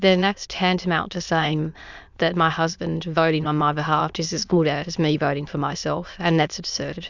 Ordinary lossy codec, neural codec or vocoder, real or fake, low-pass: Opus, 64 kbps; autoencoder, 22.05 kHz, a latent of 192 numbers a frame, VITS, trained on many speakers; fake; 7.2 kHz